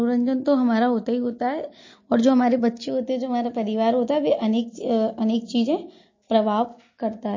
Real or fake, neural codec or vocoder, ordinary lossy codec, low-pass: real; none; MP3, 32 kbps; 7.2 kHz